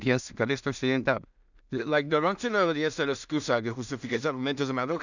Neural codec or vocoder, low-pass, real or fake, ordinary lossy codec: codec, 16 kHz in and 24 kHz out, 0.4 kbps, LongCat-Audio-Codec, two codebook decoder; 7.2 kHz; fake; none